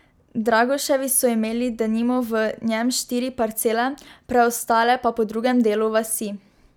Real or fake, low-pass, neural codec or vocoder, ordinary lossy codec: real; none; none; none